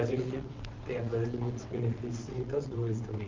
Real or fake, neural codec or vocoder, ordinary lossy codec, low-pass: fake; vocoder, 22.05 kHz, 80 mel bands, WaveNeXt; Opus, 24 kbps; 7.2 kHz